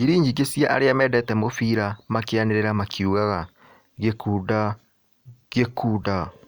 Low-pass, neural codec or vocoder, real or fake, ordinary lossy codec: none; none; real; none